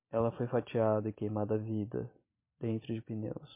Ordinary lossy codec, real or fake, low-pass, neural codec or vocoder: MP3, 24 kbps; real; 3.6 kHz; none